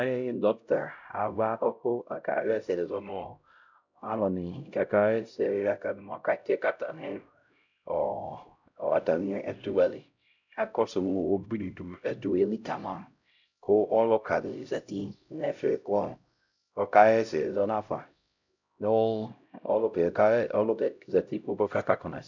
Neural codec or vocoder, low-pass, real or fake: codec, 16 kHz, 0.5 kbps, X-Codec, HuBERT features, trained on LibriSpeech; 7.2 kHz; fake